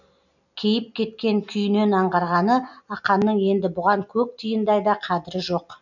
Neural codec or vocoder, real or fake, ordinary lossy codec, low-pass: none; real; none; 7.2 kHz